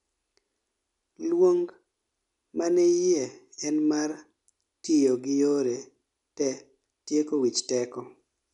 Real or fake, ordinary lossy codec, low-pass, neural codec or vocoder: real; none; 10.8 kHz; none